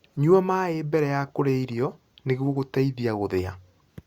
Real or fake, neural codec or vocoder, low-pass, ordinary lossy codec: real; none; 19.8 kHz; Opus, 64 kbps